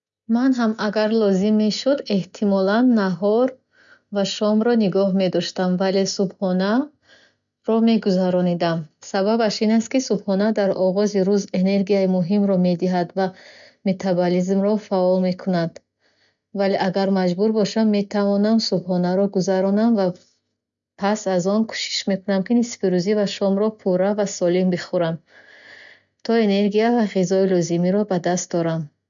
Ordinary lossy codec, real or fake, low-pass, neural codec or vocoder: none; real; 7.2 kHz; none